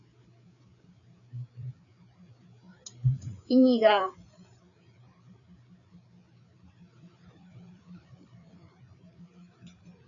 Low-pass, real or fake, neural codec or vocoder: 7.2 kHz; fake; codec, 16 kHz, 4 kbps, FreqCodec, larger model